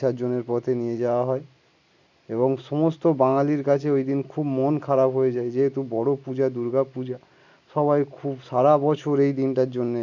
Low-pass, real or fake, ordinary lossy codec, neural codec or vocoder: 7.2 kHz; real; none; none